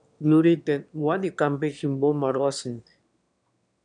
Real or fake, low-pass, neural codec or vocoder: fake; 9.9 kHz; autoencoder, 22.05 kHz, a latent of 192 numbers a frame, VITS, trained on one speaker